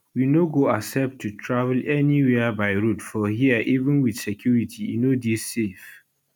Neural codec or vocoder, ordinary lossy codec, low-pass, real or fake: none; none; 19.8 kHz; real